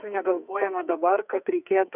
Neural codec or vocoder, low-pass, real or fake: codec, 44.1 kHz, 2.6 kbps, SNAC; 3.6 kHz; fake